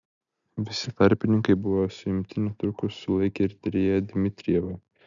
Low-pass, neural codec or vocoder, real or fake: 7.2 kHz; none; real